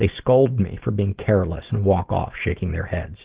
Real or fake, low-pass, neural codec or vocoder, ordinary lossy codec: real; 3.6 kHz; none; Opus, 16 kbps